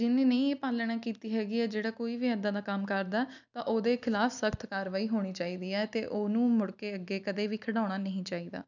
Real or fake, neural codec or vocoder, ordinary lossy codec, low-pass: real; none; none; 7.2 kHz